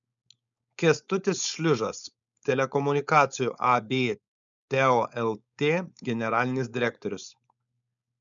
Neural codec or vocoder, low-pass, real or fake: codec, 16 kHz, 4.8 kbps, FACodec; 7.2 kHz; fake